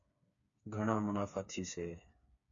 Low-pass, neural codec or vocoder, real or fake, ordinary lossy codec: 7.2 kHz; codec, 16 kHz, 4 kbps, FreqCodec, smaller model; fake; MP3, 96 kbps